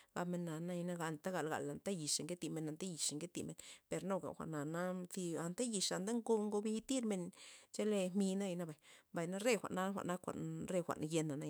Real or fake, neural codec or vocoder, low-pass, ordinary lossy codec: fake; autoencoder, 48 kHz, 128 numbers a frame, DAC-VAE, trained on Japanese speech; none; none